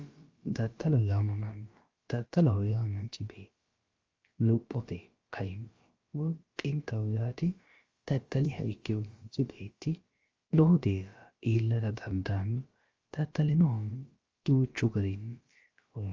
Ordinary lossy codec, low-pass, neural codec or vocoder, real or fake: Opus, 32 kbps; 7.2 kHz; codec, 16 kHz, about 1 kbps, DyCAST, with the encoder's durations; fake